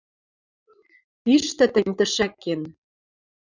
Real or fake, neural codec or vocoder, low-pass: fake; vocoder, 44.1 kHz, 80 mel bands, Vocos; 7.2 kHz